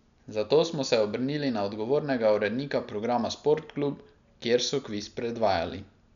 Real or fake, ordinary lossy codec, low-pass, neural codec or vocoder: real; none; 7.2 kHz; none